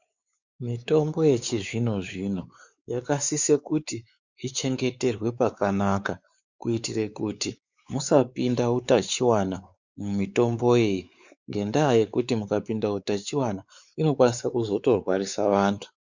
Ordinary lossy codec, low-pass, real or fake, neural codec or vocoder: Opus, 64 kbps; 7.2 kHz; fake; codec, 16 kHz, 4 kbps, X-Codec, WavLM features, trained on Multilingual LibriSpeech